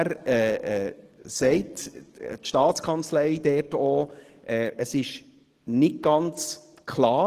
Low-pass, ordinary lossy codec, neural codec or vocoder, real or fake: 14.4 kHz; Opus, 16 kbps; codec, 44.1 kHz, 7.8 kbps, DAC; fake